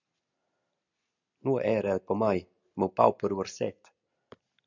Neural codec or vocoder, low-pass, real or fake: none; 7.2 kHz; real